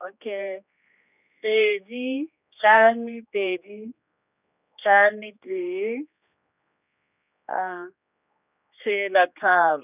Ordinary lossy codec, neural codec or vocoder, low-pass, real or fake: none; codec, 16 kHz, 2 kbps, X-Codec, HuBERT features, trained on general audio; 3.6 kHz; fake